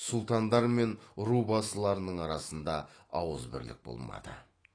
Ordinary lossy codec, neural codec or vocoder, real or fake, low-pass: AAC, 32 kbps; autoencoder, 48 kHz, 128 numbers a frame, DAC-VAE, trained on Japanese speech; fake; 9.9 kHz